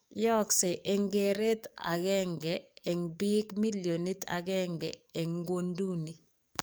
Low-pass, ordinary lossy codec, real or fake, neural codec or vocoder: none; none; fake; codec, 44.1 kHz, 7.8 kbps, DAC